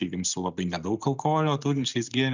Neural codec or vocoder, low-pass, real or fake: none; 7.2 kHz; real